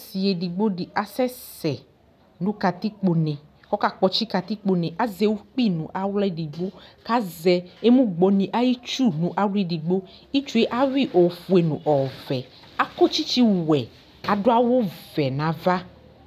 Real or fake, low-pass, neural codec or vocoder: real; 14.4 kHz; none